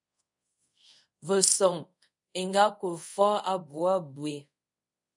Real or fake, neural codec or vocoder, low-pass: fake; codec, 24 kHz, 0.5 kbps, DualCodec; 10.8 kHz